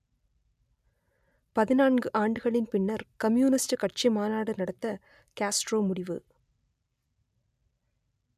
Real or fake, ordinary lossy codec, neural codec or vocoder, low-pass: real; none; none; 14.4 kHz